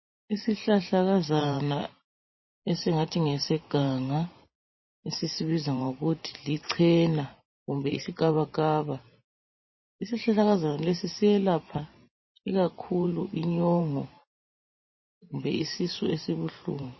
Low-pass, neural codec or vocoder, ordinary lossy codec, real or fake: 7.2 kHz; vocoder, 44.1 kHz, 128 mel bands every 512 samples, BigVGAN v2; MP3, 24 kbps; fake